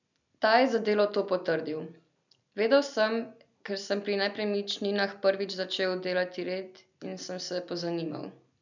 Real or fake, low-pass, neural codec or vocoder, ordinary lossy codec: real; 7.2 kHz; none; none